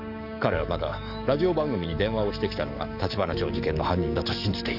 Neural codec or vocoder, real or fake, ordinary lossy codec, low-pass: codec, 44.1 kHz, 7.8 kbps, DAC; fake; none; 5.4 kHz